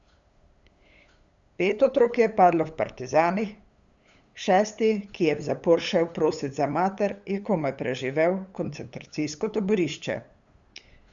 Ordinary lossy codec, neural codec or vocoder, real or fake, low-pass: Opus, 64 kbps; codec, 16 kHz, 8 kbps, FunCodec, trained on LibriTTS, 25 frames a second; fake; 7.2 kHz